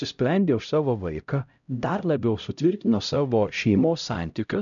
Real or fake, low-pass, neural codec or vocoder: fake; 7.2 kHz; codec, 16 kHz, 0.5 kbps, X-Codec, HuBERT features, trained on LibriSpeech